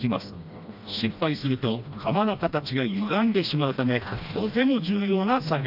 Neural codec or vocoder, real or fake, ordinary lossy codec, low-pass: codec, 16 kHz, 1 kbps, FreqCodec, smaller model; fake; AAC, 48 kbps; 5.4 kHz